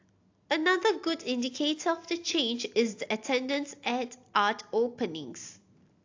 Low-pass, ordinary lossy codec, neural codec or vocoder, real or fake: 7.2 kHz; AAC, 48 kbps; none; real